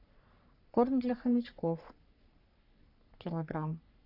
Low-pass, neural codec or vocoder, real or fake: 5.4 kHz; codec, 44.1 kHz, 3.4 kbps, Pupu-Codec; fake